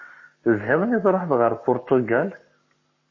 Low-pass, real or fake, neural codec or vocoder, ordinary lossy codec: 7.2 kHz; fake; codec, 44.1 kHz, 7.8 kbps, Pupu-Codec; MP3, 32 kbps